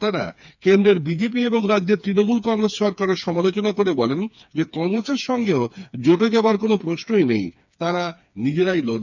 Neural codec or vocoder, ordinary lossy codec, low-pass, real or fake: codec, 16 kHz, 4 kbps, FreqCodec, smaller model; none; 7.2 kHz; fake